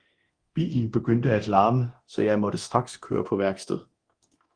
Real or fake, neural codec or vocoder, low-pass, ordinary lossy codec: fake; codec, 24 kHz, 0.9 kbps, DualCodec; 9.9 kHz; Opus, 24 kbps